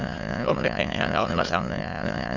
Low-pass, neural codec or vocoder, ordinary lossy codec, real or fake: 7.2 kHz; autoencoder, 22.05 kHz, a latent of 192 numbers a frame, VITS, trained on many speakers; Opus, 64 kbps; fake